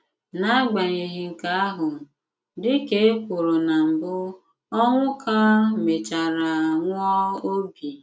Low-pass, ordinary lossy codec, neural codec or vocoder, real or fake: none; none; none; real